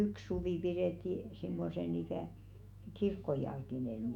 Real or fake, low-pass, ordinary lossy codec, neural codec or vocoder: fake; 19.8 kHz; none; autoencoder, 48 kHz, 128 numbers a frame, DAC-VAE, trained on Japanese speech